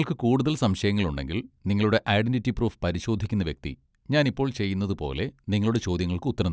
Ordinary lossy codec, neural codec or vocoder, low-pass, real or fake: none; none; none; real